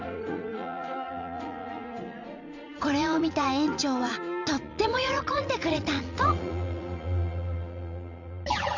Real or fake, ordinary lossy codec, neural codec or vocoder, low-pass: fake; none; vocoder, 44.1 kHz, 80 mel bands, Vocos; 7.2 kHz